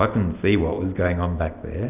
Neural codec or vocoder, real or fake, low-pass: none; real; 3.6 kHz